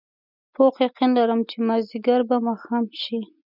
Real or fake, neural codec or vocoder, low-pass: real; none; 5.4 kHz